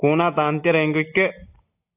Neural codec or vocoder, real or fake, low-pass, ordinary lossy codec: none; real; 3.6 kHz; AAC, 32 kbps